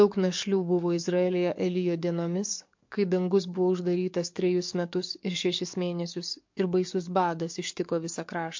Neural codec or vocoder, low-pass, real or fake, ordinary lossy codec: codec, 44.1 kHz, 7.8 kbps, DAC; 7.2 kHz; fake; MP3, 48 kbps